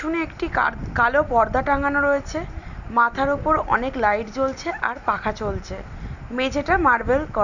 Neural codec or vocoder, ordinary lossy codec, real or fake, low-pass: none; none; real; 7.2 kHz